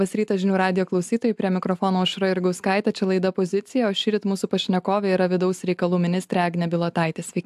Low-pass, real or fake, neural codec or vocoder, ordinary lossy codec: 14.4 kHz; real; none; AAC, 96 kbps